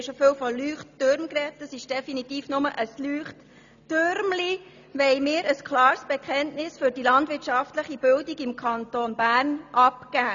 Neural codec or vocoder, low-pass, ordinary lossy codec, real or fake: none; 7.2 kHz; none; real